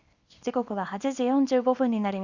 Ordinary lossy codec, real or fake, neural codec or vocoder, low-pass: Opus, 64 kbps; fake; codec, 24 kHz, 0.9 kbps, WavTokenizer, small release; 7.2 kHz